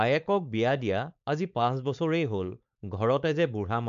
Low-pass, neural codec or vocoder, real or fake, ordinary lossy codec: 7.2 kHz; codec, 16 kHz, 4.8 kbps, FACodec; fake; MP3, 64 kbps